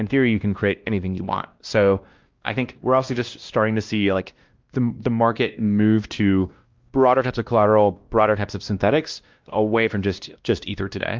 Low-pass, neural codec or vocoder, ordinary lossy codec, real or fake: 7.2 kHz; codec, 16 kHz, 1 kbps, X-Codec, WavLM features, trained on Multilingual LibriSpeech; Opus, 32 kbps; fake